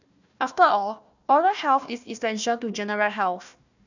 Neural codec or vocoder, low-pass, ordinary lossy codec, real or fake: codec, 16 kHz, 1 kbps, FunCodec, trained on Chinese and English, 50 frames a second; 7.2 kHz; none; fake